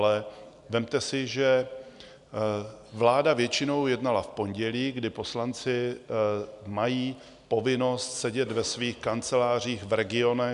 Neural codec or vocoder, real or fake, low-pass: none; real; 10.8 kHz